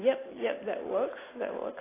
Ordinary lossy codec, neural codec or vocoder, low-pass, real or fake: AAC, 16 kbps; none; 3.6 kHz; real